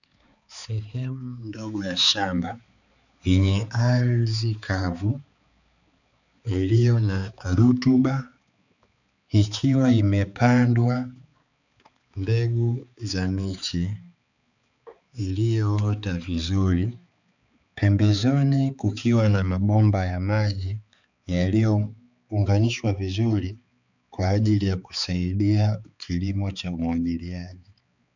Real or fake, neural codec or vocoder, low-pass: fake; codec, 16 kHz, 4 kbps, X-Codec, HuBERT features, trained on balanced general audio; 7.2 kHz